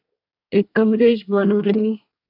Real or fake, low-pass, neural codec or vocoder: fake; 5.4 kHz; codec, 24 kHz, 1 kbps, SNAC